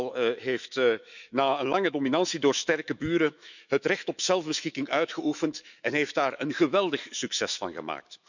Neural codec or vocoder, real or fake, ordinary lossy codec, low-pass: autoencoder, 48 kHz, 128 numbers a frame, DAC-VAE, trained on Japanese speech; fake; none; 7.2 kHz